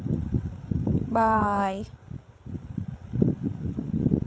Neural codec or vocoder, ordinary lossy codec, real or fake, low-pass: codec, 16 kHz, 8 kbps, FreqCodec, larger model; none; fake; none